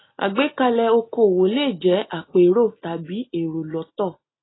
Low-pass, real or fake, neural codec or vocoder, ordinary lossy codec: 7.2 kHz; real; none; AAC, 16 kbps